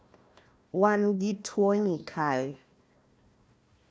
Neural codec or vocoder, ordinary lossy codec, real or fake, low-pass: codec, 16 kHz, 1 kbps, FunCodec, trained on Chinese and English, 50 frames a second; none; fake; none